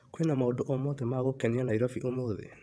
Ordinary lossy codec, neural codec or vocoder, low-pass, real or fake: none; vocoder, 22.05 kHz, 80 mel bands, WaveNeXt; none; fake